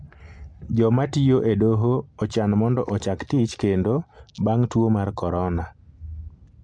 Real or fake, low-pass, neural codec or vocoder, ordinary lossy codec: real; 9.9 kHz; none; MP3, 64 kbps